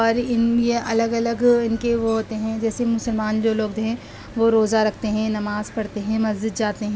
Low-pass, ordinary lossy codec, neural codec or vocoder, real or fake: none; none; none; real